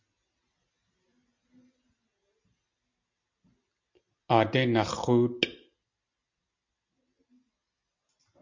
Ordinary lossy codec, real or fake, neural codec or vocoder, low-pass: MP3, 64 kbps; real; none; 7.2 kHz